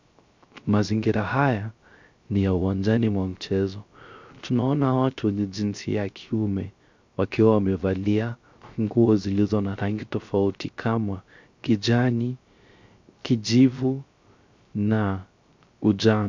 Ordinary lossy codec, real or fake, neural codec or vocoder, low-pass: MP3, 64 kbps; fake; codec, 16 kHz, 0.3 kbps, FocalCodec; 7.2 kHz